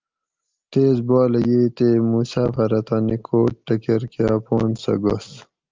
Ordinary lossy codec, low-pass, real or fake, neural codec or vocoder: Opus, 32 kbps; 7.2 kHz; real; none